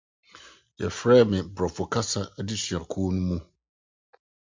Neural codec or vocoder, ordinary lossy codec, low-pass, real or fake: none; MP3, 64 kbps; 7.2 kHz; real